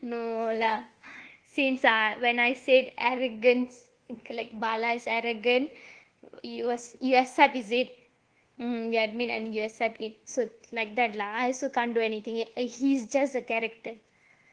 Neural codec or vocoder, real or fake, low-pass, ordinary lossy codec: codec, 24 kHz, 1.2 kbps, DualCodec; fake; 9.9 kHz; Opus, 16 kbps